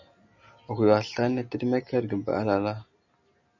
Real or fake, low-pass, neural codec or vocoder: real; 7.2 kHz; none